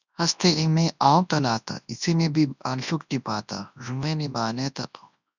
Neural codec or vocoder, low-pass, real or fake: codec, 24 kHz, 0.9 kbps, WavTokenizer, large speech release; 7.2 kHz; fake